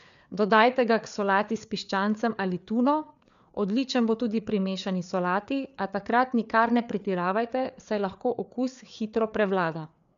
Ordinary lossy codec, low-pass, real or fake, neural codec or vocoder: none; 7.2 kHz; fake; codec, 16 kHz, 4 kbps, FreqCodec, larger model